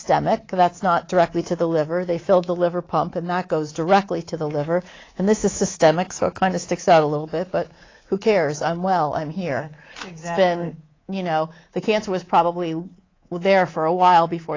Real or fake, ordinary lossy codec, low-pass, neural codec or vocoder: fake; AAC, 32 kbps; 7.2 kHz; codec, 24 kHz, 3.1 kbps, DualCodec